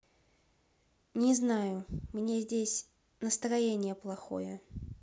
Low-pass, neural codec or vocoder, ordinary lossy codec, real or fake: none; none; none; real